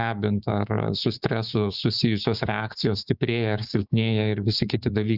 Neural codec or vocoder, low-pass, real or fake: codec, 16 kHz, 6 kbps, DAC; 5.4 kHz; fake